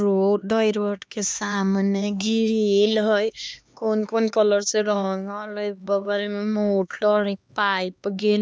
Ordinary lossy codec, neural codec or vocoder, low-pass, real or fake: none; codec, 16 kHz, 2 kbps, X-Codec, HuBERT features, trained on LibriSpeech; none; fake